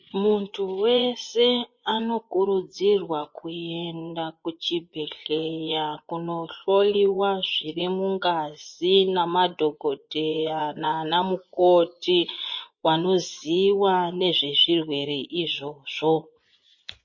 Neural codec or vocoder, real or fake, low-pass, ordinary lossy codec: vocoder, 24 kHz, 100 mel bands, Vocos; fake; 7.2 kHz; MP3, 32 kbps